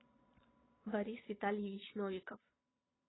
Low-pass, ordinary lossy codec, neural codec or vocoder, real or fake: 7.2 kHz; AAC, 16 kbps; vocoder, 22.05 kHz, 80 mel bands, Vocos; fake